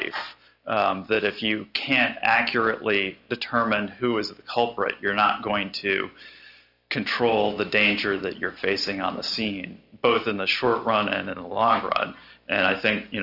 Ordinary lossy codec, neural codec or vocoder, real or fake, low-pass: Opus, 64 kbps; none; real; 5.4 kHz